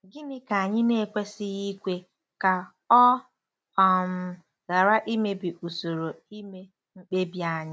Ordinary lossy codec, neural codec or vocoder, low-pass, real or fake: none; none; none; real